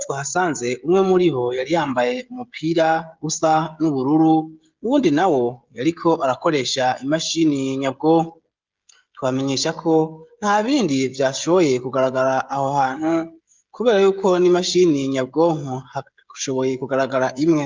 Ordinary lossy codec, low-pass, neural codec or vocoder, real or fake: Opus, 24 kbps; 7.2 kHz; codec, 16 kHz, 16 kbps, FreqCodec, smaller model; fake